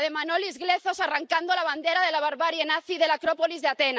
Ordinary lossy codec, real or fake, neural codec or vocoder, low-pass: none; real; none; none